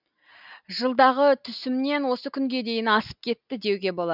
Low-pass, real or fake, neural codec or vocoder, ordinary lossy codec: 5.4 kHz; real; none; none